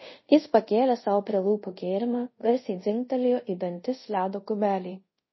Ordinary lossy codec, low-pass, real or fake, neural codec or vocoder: MP3, 24 kbps; 7.2 kHz; fake; codec, 24 kHz, 0.5 kbps, DualCodec